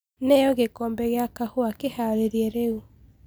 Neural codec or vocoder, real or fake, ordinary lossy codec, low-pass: none; real; none; none